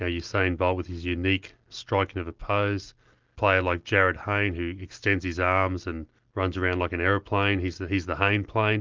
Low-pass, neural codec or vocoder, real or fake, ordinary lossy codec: 7.2 kHz; none; real; Opus, 32 kbps